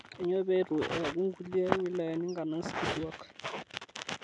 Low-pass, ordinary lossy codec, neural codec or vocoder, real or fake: 10.8 kHz; none; none; real